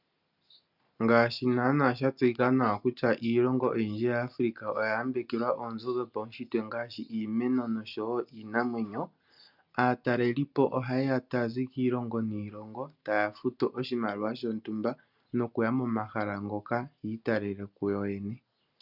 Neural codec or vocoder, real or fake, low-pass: none; real; 5.4 kHz